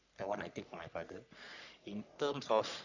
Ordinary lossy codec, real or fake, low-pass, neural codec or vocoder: none; fake; 7.2 kHz; codec, 44.1 kHz, 3.4 kbps, Pupu-Codec